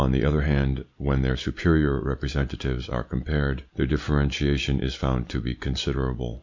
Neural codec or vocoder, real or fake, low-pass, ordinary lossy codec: none; real; 7.2 kHz; MP3, 64 kbps